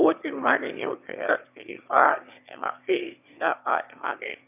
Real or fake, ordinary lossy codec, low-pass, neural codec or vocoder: fake; none; 3.6 kHz; autoencoder, 22.05 kHz, a latent of 192 numbers a frame, VITS, trained on one speaker